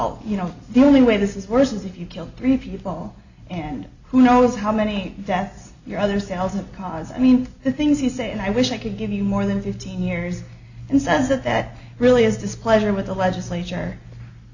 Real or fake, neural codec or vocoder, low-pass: real; none; 7.2 kHz